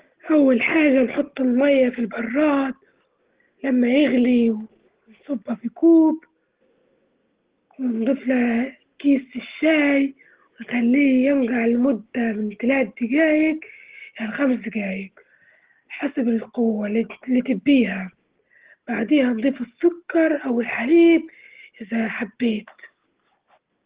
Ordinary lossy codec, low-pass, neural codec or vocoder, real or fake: Opus, 16 kbps; 3.6 kHz; none; real